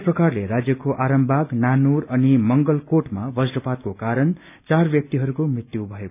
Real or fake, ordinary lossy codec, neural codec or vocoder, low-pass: real; none; none; 3.6 kHz